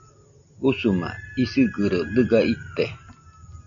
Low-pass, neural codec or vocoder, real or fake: 7.2 kHz; none; real